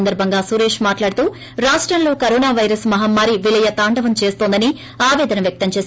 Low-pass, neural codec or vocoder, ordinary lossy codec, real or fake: none; none; none; real